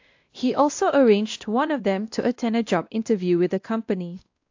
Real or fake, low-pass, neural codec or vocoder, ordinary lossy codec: fake; 7.2 kHz; codec, 16 kHz, 1 kbps, X-Codec, WavLM features, trained on Multilingual LibriSpeech; AAC, 48 kbps